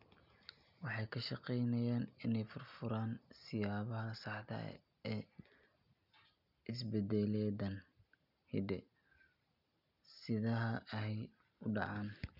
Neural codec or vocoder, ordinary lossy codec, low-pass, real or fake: none; none; 5.4 kHz; real